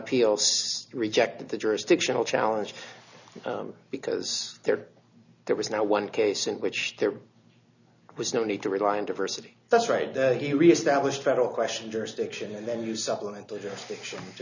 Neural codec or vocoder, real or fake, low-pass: none; real; 7.2 kHz